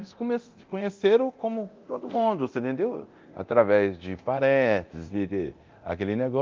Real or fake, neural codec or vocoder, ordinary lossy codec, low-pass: fake; codec, 24 kHz, 0.9 kbps, DualCodec; Opus, 32 kbps; 7.2 kHz